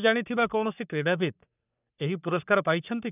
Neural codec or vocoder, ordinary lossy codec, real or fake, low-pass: codec, 44.1 kHz, 3.4 kbps, Pupu-Codec; none; fake; 3.6 kHz